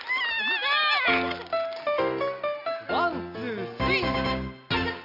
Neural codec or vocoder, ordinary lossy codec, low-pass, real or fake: none; none; 5.4 kHz; real